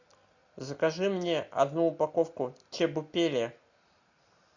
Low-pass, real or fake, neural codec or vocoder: 7.2 kHz; real; none